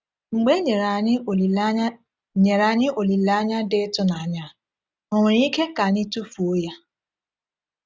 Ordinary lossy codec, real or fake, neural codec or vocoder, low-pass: none; real; none; none